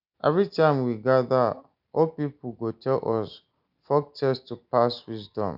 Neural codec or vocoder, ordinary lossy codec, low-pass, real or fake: none; none; 5.4 kHz; real